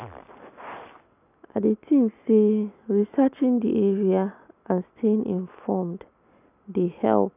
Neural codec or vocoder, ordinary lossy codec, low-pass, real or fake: none; none; 3.6 kHz; real